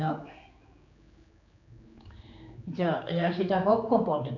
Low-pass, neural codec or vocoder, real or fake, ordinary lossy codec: 7.2 kHz; codec, 16 kHz, 4 kbps, X-Codec, HuBERT features, trained on general audio; fake; none